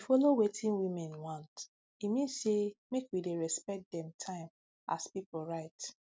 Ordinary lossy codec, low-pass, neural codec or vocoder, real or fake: none; none; none; real